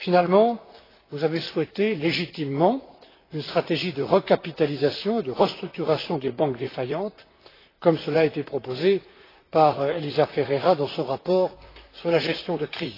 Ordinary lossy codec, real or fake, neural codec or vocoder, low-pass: AAC, 24 kbps; fake; vocoder, 44.1 kHz, 128 mel bands, Pupu-Vocoder; 5.4 kHz